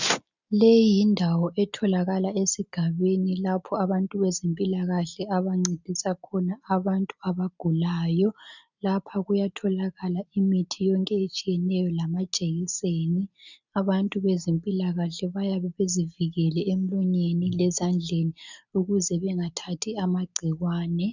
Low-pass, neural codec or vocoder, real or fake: 7.2 kHz; none; real